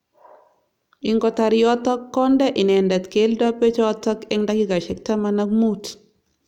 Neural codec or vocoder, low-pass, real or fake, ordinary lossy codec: none; 19.8 kHz; real; none